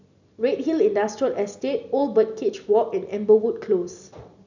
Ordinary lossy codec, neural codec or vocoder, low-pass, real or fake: none; none; 7.2 kHz; real